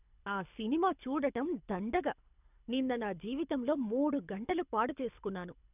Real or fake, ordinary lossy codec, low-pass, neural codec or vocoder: fake; none; 3.6 kHz; codec, 24 kHz, 6 kbps, HILCodec